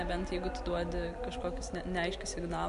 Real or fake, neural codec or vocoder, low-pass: real; none; 10.8 kHz